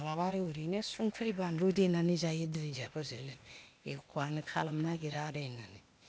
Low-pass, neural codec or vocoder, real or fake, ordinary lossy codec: none; codec, 16 kHz, 0.8 kbps, ZipCodec; fake; none